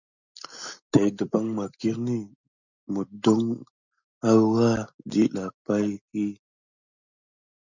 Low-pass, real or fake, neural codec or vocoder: 7.2 kHz; real; none